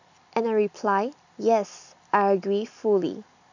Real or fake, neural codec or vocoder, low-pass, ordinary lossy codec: real; none; 7.2 kHz; none